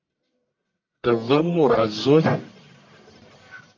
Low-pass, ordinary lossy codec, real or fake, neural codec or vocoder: 7.2 kHz; AAC, 48 kbps; fake; codec, 44.1 kHz, 1.7 kbps, Pupu-Codec